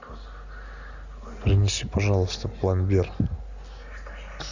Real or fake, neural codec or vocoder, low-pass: real; none; 7.2 kHz